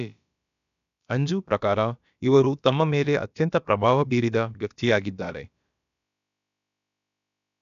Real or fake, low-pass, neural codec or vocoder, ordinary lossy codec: fake; 7.2 kHz; codec, 16 kHz, about 1 kbps, DyCAST, with the encoder's durations; none